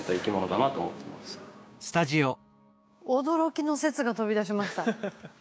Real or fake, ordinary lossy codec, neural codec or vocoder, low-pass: fake; none; codec, 16 kHz, 6 kbps, DAC; none